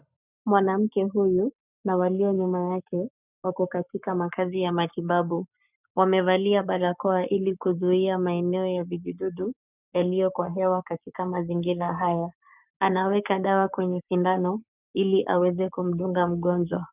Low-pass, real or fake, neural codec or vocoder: 3.6 kHz; fake; codec, 44.1 kHz, 7.8 kbps, Pupu-Codec